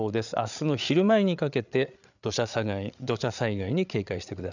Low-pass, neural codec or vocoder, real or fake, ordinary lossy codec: 7.2 kHz; codec, 16 kHz, 8 kbps, FreqCodec, larger model; fake; none